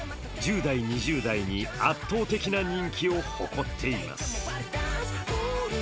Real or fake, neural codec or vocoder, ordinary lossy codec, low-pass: real; none; none; none